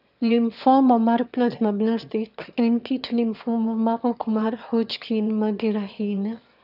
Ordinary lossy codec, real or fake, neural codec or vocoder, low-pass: none; fake; autoencoder, 22.05 kHz, a latent of 192 numbers a frame, VITS, trained on one speaker; 5.4 kHz